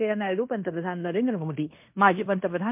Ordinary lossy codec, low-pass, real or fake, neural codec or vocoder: MP3, 32 kbps; 3.6 kHz; fake; codec, 16 kHz in and 24 kHz out, 0.9 kbps, LongCat-Audio-Codec, fine tuned four codebook decoder